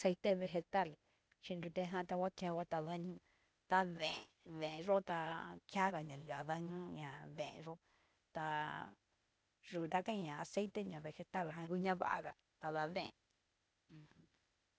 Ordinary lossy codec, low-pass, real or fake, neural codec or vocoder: none; none; fake; codec, 16 kHz, 0.8 kbps, ZipCodec